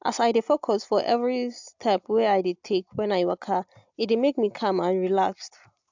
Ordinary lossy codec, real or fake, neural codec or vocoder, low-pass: MP3, 64 kbps; real; none; 7.2 kHz